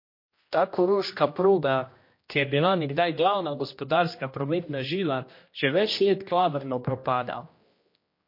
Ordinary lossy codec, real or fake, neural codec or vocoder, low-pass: MP3, 32 kbps; fake; codec, 16 kHz, 1 kbps, X-Codec, HuBERT features, trained on general audio; 5.4 kHz